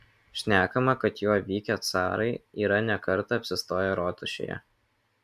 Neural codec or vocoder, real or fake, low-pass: none; real; 14.4 kHz